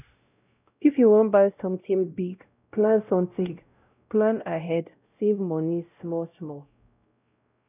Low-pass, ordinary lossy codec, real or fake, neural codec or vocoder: 3.6 kHz; none; fake; codec, 16 kHz, 0.5 kbps, X-Codec, WavLM features, trained on Multilingual LibriSpeech